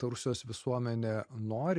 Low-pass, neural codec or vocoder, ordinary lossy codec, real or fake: 9.9 kHz; none; MP3, 64 kbps; real